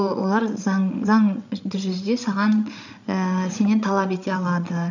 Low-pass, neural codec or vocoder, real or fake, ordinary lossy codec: 7.2 kHz; codec, 16 kHz, 16 kbps, FreqCodec, larger model; fake; none